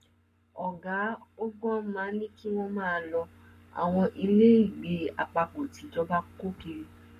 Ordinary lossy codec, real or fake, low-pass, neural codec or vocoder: MP3, 64 kbps; fake; 14.4 kHz; codec, 44.1 kHz, 7.8 kbps, Pupu-Codec